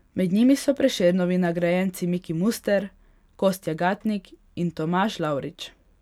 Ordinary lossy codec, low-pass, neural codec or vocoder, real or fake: none; 19.8 kHz; none; real